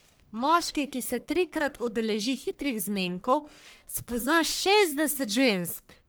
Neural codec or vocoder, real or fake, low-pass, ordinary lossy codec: codec, 44.1 kHz, 1.7 kbps, Pupu-Codec; fake; none; none